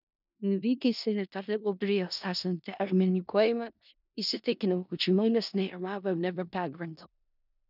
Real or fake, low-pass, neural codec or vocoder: fake; 5.4 kHz; codec, 16 kHz in and 24 kHz out, 0.4 kbps, LongCat-Audio-Codec, four codebook decoder